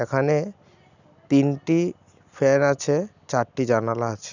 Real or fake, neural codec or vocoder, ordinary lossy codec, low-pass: real; none; none; 7.2 kHz